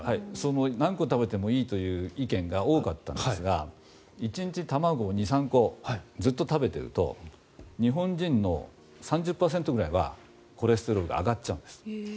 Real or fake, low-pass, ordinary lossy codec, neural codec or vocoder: real; none; none; none